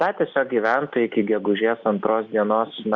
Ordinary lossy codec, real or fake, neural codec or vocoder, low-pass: Opus, 64 kbps; real; none; 7.2 kHz